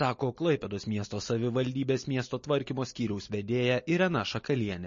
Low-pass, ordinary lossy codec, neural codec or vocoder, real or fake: 7.2 kHz; MP3, 32 kbps; none; real